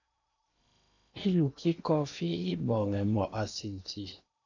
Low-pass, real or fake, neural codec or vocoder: 7.2 kHz; fake; codec, 16 kHz in and 24 kHz out, 0.8 kbps, FocalCodec, streaming, 65536 codes